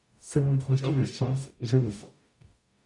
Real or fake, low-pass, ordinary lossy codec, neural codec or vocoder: fake; 10.8 kHz; MP3, 64 kbps; codec, 44.1 kHz, 0.9 kbps, DAC